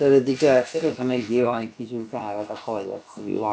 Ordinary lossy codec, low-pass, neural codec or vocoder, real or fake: none; none; codec, 16 kHz, about 1 kbps, DyCAST, with the encoder's durations; fake